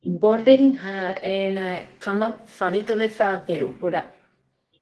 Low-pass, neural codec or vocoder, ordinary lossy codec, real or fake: 10.8 kHz; codec, 24 kHz, 0.9 kbps, WavTokenizer, medium music audio release; Opus, 16 kbps; fake